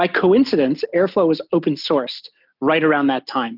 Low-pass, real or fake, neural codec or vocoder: 5.4 kHz; real; none